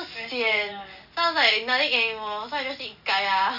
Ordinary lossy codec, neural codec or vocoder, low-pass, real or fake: none; none; 5.4 kHz; real